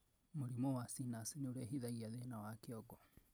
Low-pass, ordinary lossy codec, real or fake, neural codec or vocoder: none; none; real; none